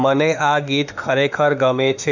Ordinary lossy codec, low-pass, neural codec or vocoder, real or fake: none; 7.2 kHz; autoencoder, 48 kHz, 32 numbers a frame, DAC-VAE, trained on Japanese speech; fake